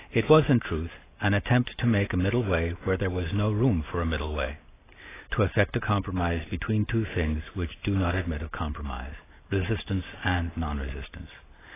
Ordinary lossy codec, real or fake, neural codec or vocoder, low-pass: AAC, 16 kbps; real; none; 3.6 kHz